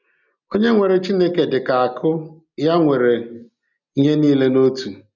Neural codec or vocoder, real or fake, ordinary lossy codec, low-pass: none; real; none; 7.2 kHz